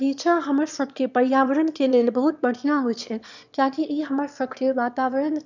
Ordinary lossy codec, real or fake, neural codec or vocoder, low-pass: none; fake; autoencoder, 22.05 kHz, a latent of 192 numbers a frame, VITS, trained on one speaker; 7.2 kHz